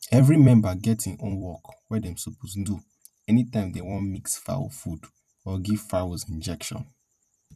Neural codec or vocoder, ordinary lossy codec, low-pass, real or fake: vocoder, 44.1 kHz, 128 mel bands every 256 samples, BigVGAN v2; none; 14.4 kHz; fake